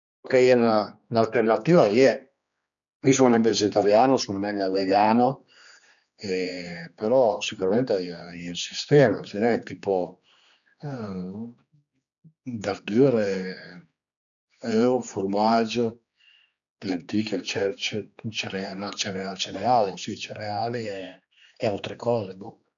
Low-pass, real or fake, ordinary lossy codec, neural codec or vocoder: 7.2 kHz; fake; none; codec, 16 kHz, 2 kbps, X-Codec, HuBERT features, trained on general audio